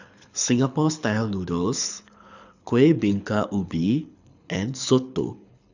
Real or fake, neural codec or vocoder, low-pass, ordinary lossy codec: fake; codec, 24 kHz, 6 kbps, HILCodec; 7.2 kHz; none